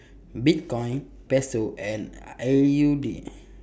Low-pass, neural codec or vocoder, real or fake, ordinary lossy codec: none; none; real; none